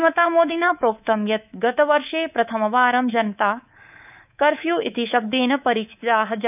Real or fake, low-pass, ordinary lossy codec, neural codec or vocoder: fake; 3.6 kHz; none; codec, 24 kHz, 3.1 kbps, DualCodec